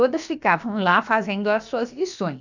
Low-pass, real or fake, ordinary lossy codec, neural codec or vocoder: 7.2 kHz; fake; none; codec, 16 kHz, about 1 kbps, DyCAST, with the encoder's durations